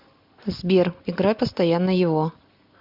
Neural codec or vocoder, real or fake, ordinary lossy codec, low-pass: none; real; MP3, 48 kbps; 5.4 kHz